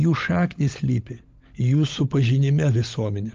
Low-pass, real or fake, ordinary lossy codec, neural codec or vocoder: 7.2 kHz; fake; Opus, 32 kbps; codec, 16 kHz, 16 kbps, FunCodec, trained on LibriTTS, 50 frames a second